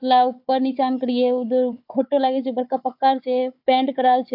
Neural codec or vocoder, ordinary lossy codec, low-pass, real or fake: codec, 16 kHz, 4 kbps, FunCodec, trained on Chinese and English, 50 frames a second; AAC, 48 kbps; 5.4 kHz; fake